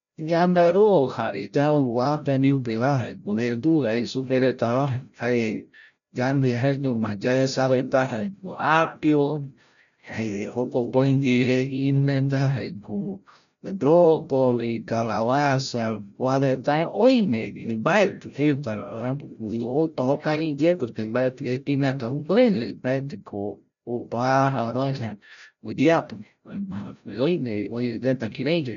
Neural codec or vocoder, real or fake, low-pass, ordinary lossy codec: codec, 16 kHz, 0.5 kbps, FreqCodec, larger model; fake; 7.2 kHz; none